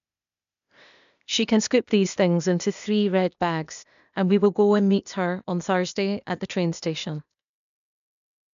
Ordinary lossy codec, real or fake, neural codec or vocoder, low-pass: none; fake; codec, 16 kHz, 0.8 kbps, ZipCodec; 7.2 kHz